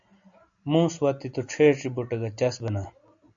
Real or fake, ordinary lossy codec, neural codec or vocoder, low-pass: real; AAC, 64 kbps; none; 7.2 kHz